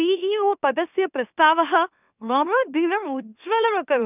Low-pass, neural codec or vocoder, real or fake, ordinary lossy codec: 3.6 kHz; autoencoder, 44.1 kHz, a latent of 192 numbers a frame, MeloTTS; fake; none